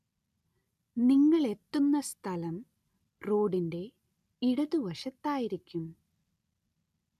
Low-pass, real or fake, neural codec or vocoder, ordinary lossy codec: 14.4 kHz; real; none; none